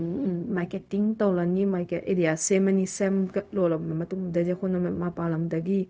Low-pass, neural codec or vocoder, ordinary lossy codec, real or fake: none; codec, 16 kHz, 0.4 kbps, LongCat-Audio-Codec; none; fake